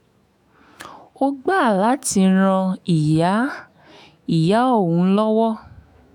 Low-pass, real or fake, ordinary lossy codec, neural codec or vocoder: 19.8 kHz; fake; none; autoencoder, 48 kHz, 128 numbers a frame, DAC-VAE, trained on Japanese speech